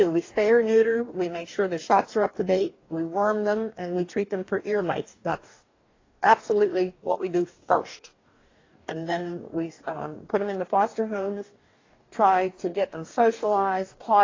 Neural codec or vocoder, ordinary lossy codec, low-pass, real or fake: codec, 44.1 kHz, 2.6 kbps, DAC; AAC, 32 kbps; 7.2 kHz; fake